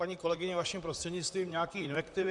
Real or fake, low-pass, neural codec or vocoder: fake; 10.8 kHz; vocoder, 44.1 kHz, 128 mel bands, Pupu-Vocoder